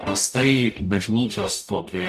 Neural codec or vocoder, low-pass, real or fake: codec, 44.1 kHz, 0.9 kbps, DAC; 14.4 kHz; fake